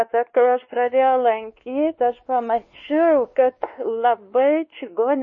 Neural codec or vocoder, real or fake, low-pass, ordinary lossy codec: codec, 16 kHz, 2 kbps, X-Codec, WavLM features, trained on Multilingual LibriSpeech; fake; 7.2 kHz; MP3, 32 kbps